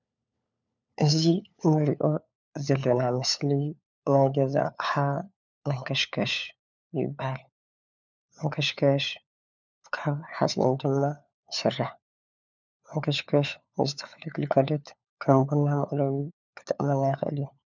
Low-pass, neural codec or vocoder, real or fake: 7.2 kHz; codec, 16 kHz, 4 kbps, FunCodec, trained on LibriTTS, 50 frames a second; fake